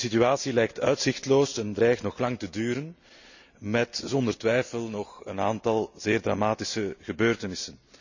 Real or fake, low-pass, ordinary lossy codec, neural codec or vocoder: real; 7.2 kHz; none; none